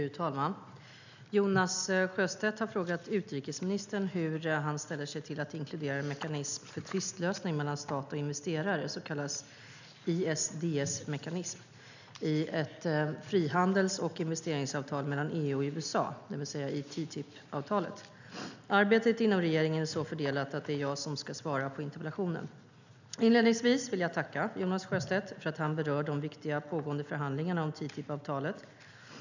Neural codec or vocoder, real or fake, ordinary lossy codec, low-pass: none; real; none; 7.2 kHz